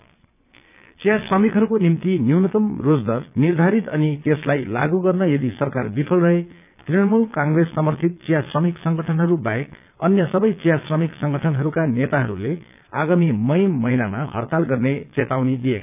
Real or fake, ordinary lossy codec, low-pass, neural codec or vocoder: fake; none; 3.6 kHz; vocoder, 22.05 kHz, 80 mel bands, Vocos